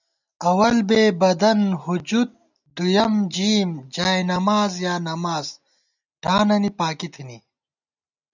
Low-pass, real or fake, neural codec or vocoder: 7.2 kHz; real; none